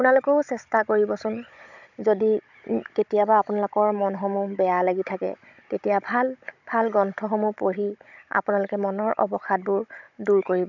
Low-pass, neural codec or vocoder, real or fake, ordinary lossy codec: 7.2 kHz; codec, 16 kHz, 16 kbps, FunCodec, trained on Chinese and English, 50 frames a second; fake; none